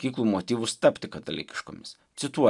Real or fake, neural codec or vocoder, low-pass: real; none; 10.8 kHz